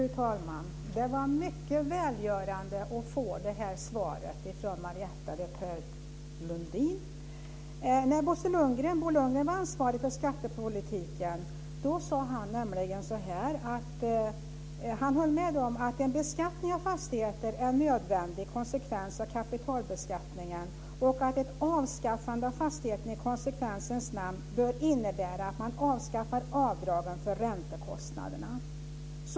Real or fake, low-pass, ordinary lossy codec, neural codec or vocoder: real; none; none; none